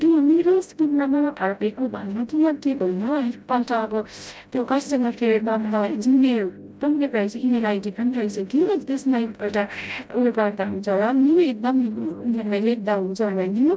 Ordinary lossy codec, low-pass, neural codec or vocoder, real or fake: none; none; codec, 16 kHz, 0.5 kbps, FreqCodec, smaller model; fake